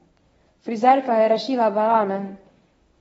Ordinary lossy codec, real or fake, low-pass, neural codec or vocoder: AAC, 24 kbps; fake; 10.8 kHz; codec, 24 kHz, 0.9 kbps, WavTokenizer, medium speech release version 1